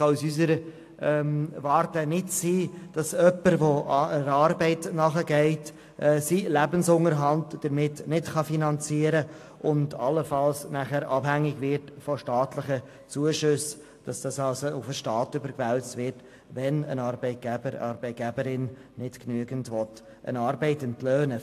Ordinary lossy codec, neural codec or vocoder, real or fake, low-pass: AAC, 64 kbps; none; real; 14.4 kHz